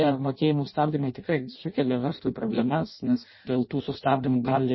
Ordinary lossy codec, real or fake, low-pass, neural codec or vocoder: MP3, 24 kbps; fake; 7.2 kHz; codec, 16 kHz in and 24 kHz out, 0.6 kbps, FireRedTTS-2 codec